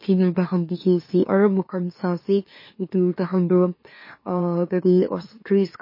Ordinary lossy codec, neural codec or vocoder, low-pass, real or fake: MP3, 24 kbps; autoencoder, 44.1 kHz, a latent of 192 numbers a frame, MeloTTS; 5.4 kHz; fake